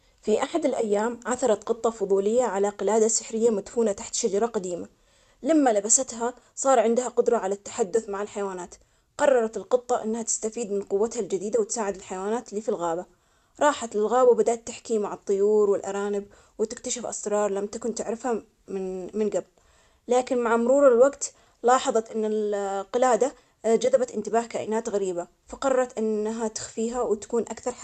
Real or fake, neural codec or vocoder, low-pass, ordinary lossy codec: real; none; 14.4 kHz; none